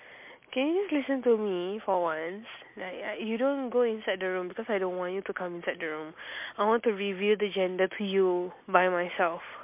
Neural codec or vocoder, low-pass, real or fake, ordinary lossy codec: none; 3.6 kHz; real; MP3, 32 kbps